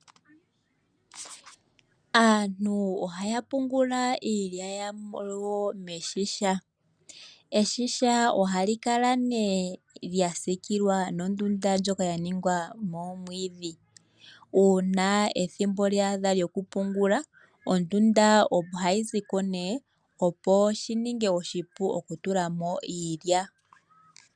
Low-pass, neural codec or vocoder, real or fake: 9.9 kHz; none; real